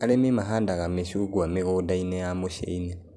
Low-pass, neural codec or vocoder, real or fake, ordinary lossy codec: none; none; real; none